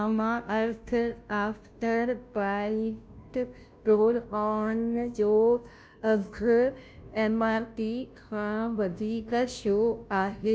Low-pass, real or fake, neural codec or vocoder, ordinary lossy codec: none; fake; codec, 16 kHz, 0.5 kbps, FunCodec, trained on Chinese and English, 25 frames a second; none